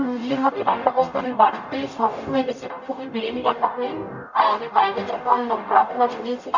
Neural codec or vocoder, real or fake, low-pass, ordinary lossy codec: codec, 44.1 kHz, 0.9 kbps, DAC; fake; 7.2 kHz; none